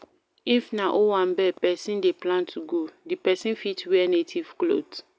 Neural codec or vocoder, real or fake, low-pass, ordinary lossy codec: none; real; none; none